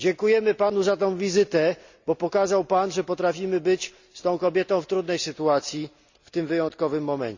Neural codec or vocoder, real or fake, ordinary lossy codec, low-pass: none; real; Opus, 64 kbps; 7.2 kHz